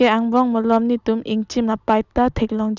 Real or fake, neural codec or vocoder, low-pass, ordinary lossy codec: real; none; 7.2 kHz; none